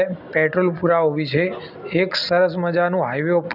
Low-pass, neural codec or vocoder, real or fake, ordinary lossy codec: 5.4 kHz; none; real; none